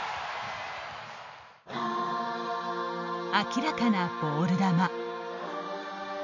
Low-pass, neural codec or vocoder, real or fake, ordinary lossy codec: 7.2 kHz; none; real; none